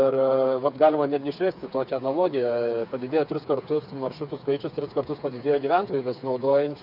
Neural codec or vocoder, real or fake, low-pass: codec, 16 kHz, 4 kbps, FreqCodec, smaller model; fake; 5.4 kHz